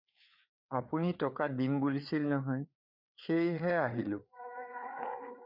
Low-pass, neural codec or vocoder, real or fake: 5.4 kHz; codec, 16 kHz, 4 kbps, FreqCodec, larger model; fake